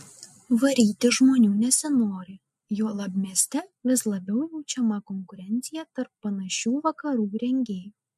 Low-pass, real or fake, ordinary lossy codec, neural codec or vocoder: 14.4 kHz; real; MP3, 64 kbps; none